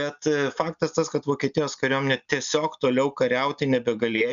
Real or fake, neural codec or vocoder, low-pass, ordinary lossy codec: real; none; 7.2 kHz; MP3, 96 kbps